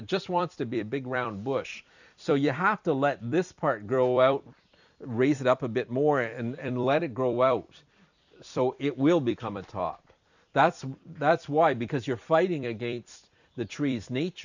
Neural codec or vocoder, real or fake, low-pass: vocoder, 44.1 kHz, 128 mel bands every 256 samples, BigVGAN v2; fake; 7.2 kHz